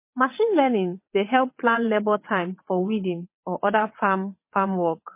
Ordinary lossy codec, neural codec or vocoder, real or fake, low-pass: MP3, 24 kbps; vocoder, 22.05 kHz, 80 mel bands, WaveNeXt; fake; 3.6 kHz